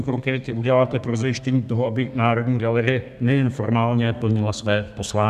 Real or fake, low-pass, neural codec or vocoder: fake; 14.4 kHz; codec, 32 kHz, 1.9 kbps, SNAC